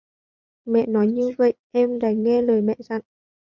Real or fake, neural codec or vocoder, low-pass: fake; vocoder, 22.05 kHz, 80 mel bands, Vocos; 7.2 kHz